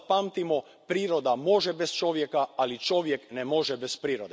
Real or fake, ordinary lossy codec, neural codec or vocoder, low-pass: real; none; none; none